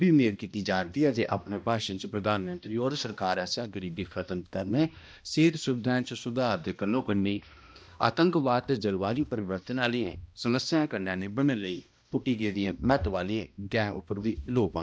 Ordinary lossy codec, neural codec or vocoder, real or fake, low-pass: none; codec, 16 kHz, 1 kbps, X-Codec, HuBERT features, trained on balanced general audio; fake; none